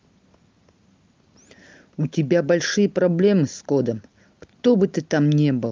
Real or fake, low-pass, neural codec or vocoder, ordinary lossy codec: real; 7.2 kHz; none; Opus, 24 kbps